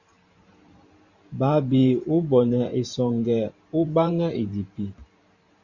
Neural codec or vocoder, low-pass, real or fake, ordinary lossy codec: vocoder, 44.1 kHz, 128 mel bands every 512 samples, BigVGAN v2; 7.2 kHz; fake; Opus, 64 kbps